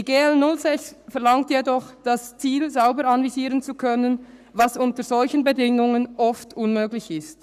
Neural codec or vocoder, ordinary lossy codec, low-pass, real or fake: codec, 44.1 kHz, 7.8 kbps, Pupu-Codec; none; 14.4 kHz; fake